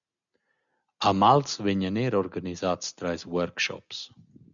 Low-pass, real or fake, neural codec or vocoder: 7.2 kHz; real; none